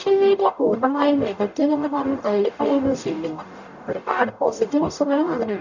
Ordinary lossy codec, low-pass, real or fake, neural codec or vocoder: none; 7.2 kHz; fake; codec, 44.1 kHz, 0.9 kbps, DAC